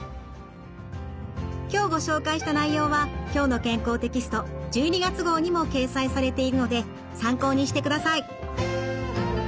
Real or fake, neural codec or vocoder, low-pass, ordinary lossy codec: real; none; none; none